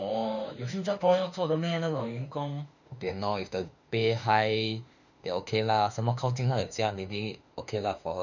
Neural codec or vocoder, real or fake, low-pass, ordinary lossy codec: autoencoder, 48 kHz, 32 numbers a frame, DAC-VAE, trained on Japanese speech; fake; 7.2 kHz; none